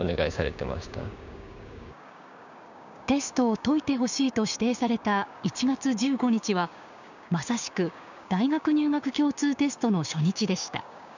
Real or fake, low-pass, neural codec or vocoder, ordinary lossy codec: fake; 7.2 kHz; codec, 16 kHz, 6 kbps, DAC; none